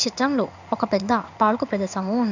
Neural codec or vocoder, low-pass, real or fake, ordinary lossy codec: codec, 16 kHz in and 24 kHz out, 1 kbps, XY-Tokenizer; 7.2 kHz; fake; none